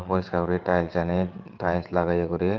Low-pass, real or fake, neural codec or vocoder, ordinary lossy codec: 7.2 kHz; fake; vocoder, 22.05 kHz, 80 mel bands, WaveNeXt; Opus, 32 kbps